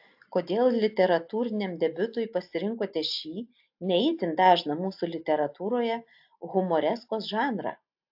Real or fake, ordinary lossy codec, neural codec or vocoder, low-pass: real; AAC, 48 kbps; none; 5.4 kHz